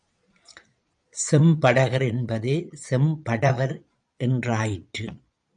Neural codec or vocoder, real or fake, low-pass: vocoder, 22.05 kHz, 80 mel bands, Vocos; fake; 9.9 kHz